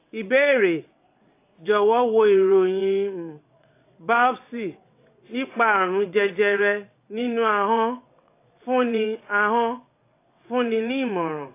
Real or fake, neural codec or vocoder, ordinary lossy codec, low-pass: fake; vocoder, 22.05 kHz, 80 mel bands, WaveNeXt; AAC, 24 kbps; 3.6 kHz